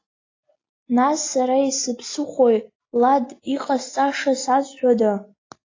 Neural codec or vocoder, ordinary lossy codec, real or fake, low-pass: none; AAC, 32 kbps; real; 7.2 kHz